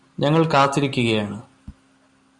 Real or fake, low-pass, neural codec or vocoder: real; 10.8 kHz; none